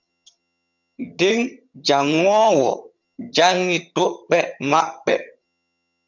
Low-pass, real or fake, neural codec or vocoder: 7.2 kHz; fake; vocoder, 22.05 kHz, 80 mel bands, HiFi-GAN